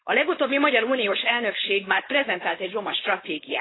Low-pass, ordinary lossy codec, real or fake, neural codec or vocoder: 7.2 kHz; AAC, 16 kbps; fake; codec, 16 kHz, 4.8 kbps, FACodec